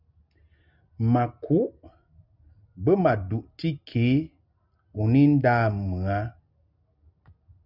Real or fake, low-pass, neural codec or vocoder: real; 5.4 kHz; none